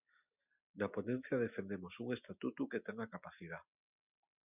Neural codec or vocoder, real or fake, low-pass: none; real; 3.6 kHz